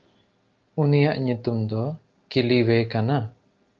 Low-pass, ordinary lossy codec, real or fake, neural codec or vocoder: 7.2 kHz; Opus, 24 kbps; real; none